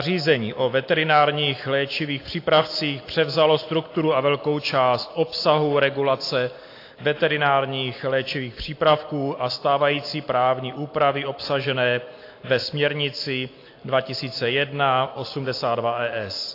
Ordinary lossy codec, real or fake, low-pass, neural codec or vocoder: AAC, 32 kbps; real; 5.4 kHz; none